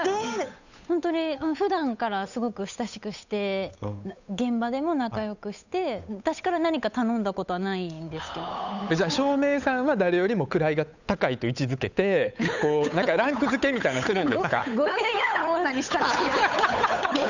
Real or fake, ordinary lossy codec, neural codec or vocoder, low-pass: fake; none; codec, 16 kHz, 8 kbps, FunCodec, trained on Chinese and English, 25 frames a second; 7.2 kHz